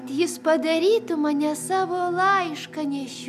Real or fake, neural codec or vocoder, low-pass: real; none; 14.4 kHz